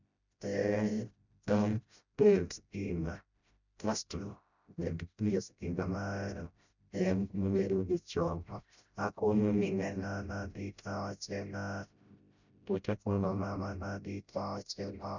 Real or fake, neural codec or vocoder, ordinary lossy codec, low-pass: fake; codec, 16 kHz, 0.5 kbps, FreqCodec, smaller model; none; 7.2 kHz